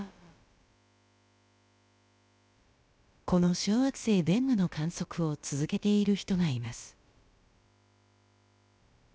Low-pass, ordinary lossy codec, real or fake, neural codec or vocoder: none; none; fake; codec, 16 kHz, about 1 kbps, DyCAST, with the encoder's durations